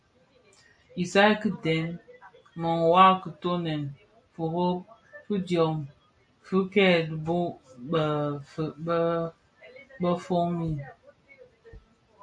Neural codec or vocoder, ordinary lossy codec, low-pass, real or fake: none; AAC, 64 kbps; 9.9 kHz; real